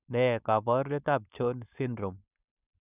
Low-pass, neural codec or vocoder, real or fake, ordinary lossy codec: 3.6 kHz; codec, 16 kHz, 4.8 kbps, FACodec; fake; none